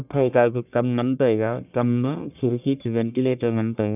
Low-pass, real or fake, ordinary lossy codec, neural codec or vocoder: 3.6 kHz; fake; none; codec, 44.1 kHz, 1.7 kbps, Pupu-Codec